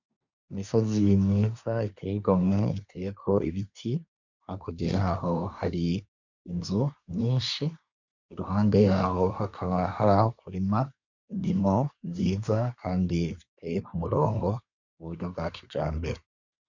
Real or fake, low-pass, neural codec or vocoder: fake; 7.2 kHz; codec, 24 kHz, 1 kbps, SNAC